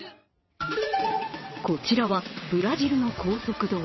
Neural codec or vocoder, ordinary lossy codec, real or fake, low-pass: vocoder, 22.05 kHz, 80 mel bands, WaveNeXt; MP3, 24 kbps; fake; 7.2 kHz